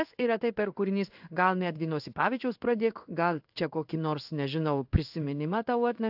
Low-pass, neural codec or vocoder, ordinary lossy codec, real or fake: 5.4 kHz; codec, 16 kHz in and 24 kHz out, 1 kbps, XY-Tokenizer; AAC, 48 kbps; fake